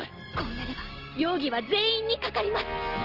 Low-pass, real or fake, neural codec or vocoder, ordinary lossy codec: 5.4 kHz; real; none; Opus, 16 kbps